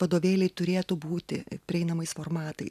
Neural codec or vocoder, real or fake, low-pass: none; real; 14.4 kHz